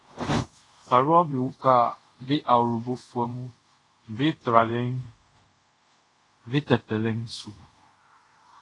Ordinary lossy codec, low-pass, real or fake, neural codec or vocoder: AAC, 32 kbps; 10.8 kHz; fake; codec, 24 kHz, 0.5 kbps, DualCodec